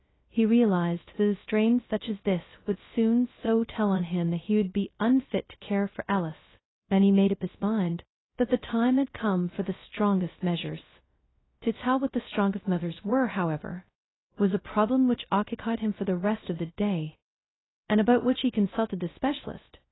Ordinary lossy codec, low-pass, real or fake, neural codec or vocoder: AAC, 16 kbps; 7.2 kHz; fake; codec, 16 kHz, 0.2 kbps, FocalCodec